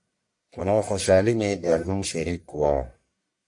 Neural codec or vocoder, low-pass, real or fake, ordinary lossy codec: codec, 44.1 kHz, 1.7 kbps, Pupu-Codec; 10.8 kHz; fake; AAC, 64 kbps